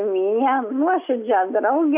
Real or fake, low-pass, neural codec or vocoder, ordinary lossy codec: real; 3.6 kHz; none; AAC, 32 kbps